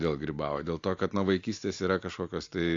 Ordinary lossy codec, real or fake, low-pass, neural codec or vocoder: AAC, 48 kbps; real; 7.2 kHz; none